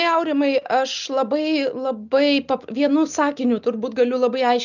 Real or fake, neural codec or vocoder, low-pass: real; none; 7.2 kHz